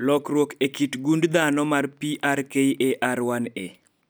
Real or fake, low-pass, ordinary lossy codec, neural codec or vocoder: real; none; none; none